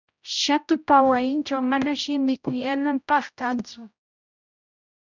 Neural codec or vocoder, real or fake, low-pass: codec, 16 kHz, 0.5 kbps, X-Codec, HuBERT features, trained on general audio; fake; 7.2 kHz